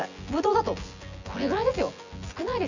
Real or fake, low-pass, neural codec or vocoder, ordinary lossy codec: fake; 7.2 kHz; vocoder, 24 kHz, 100 mel bands, Vocos; none